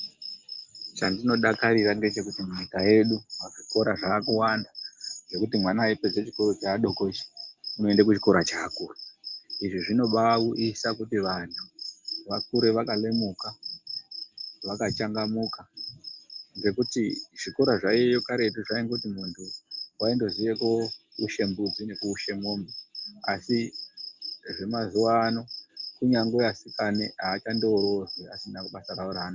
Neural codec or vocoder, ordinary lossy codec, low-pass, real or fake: none; Opus, 32 kbps; 7.2 kHz; real